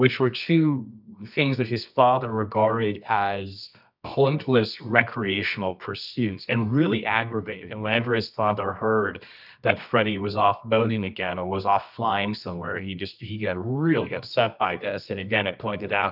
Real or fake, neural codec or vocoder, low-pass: fake; codec, 24 kHz, 0.9 kbps, WavTokenizer, medium music audio release; 5.4 kHz